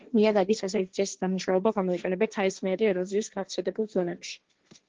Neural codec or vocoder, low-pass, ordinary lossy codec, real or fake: codec, 16 kHz, 1.1 kbps, Voila-Tokenizer; 7.2 kHz; Opus, 16 kbps; fake